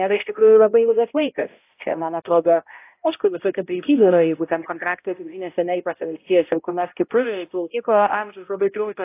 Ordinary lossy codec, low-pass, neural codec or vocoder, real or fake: AAC, 24 kbps; 3.6 kHz; codec, 16 kHz, 0.5 kbps, X-Codec, HuBERT features, trained on balanced general audio; fake